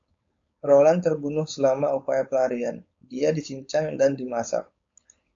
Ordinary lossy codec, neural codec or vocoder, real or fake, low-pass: AAC, 48 kbps; codec, 16 kHz, 4.8 kbps, FACodec; fake; 7.2 kHz